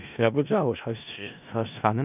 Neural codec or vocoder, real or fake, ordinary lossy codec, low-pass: codec, 16 kHz in and 24 kHz out, 0.4 kbps, LongCat-Audio-Codec, four codebook decoder; fake; none; 3.6 kHz